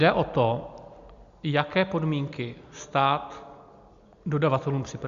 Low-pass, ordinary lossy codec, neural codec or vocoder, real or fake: 7.2 kHz; Opus, 64 kbps; none; real